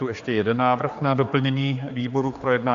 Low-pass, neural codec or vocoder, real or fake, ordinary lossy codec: 7.2 kHz; codec, 16 kHz, 4 kbps, X-Codec, HuBERT features, trained on general audio; fake; AAC, 64 kbps